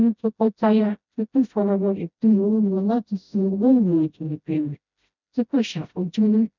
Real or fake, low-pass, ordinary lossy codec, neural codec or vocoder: fake; 7.2 kHz; none; codec, 16 kHz, 0.5 kbps, FreqCodec, smaller model